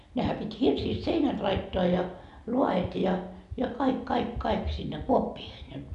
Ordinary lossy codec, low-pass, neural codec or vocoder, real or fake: none; 10.8 kHz; none; real